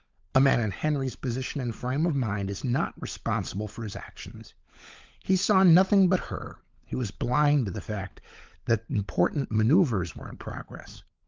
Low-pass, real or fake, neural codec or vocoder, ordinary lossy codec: 7.2 kHz; fake; codec, 16 kHz, 16 kbps, FunCodec, trained on LibriTTS, 50 frames a second; Opus, 32 kbps